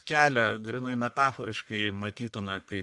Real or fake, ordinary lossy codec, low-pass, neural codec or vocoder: fake; AAC, 64 kbps; 10.8 kHz; codec, 44.1 kHz, 1.7 kbps, Pupu-Codec